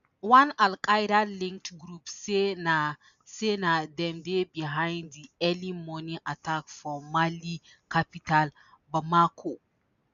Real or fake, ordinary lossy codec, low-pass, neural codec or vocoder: real; none; 7.2 kHz; none